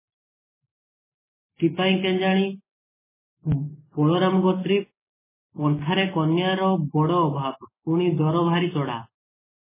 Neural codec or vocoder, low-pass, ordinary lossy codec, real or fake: none; 3.6 kHz; MP3, 16 kbps; real